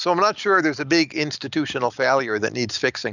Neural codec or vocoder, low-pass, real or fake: none; 7.2 kHz; real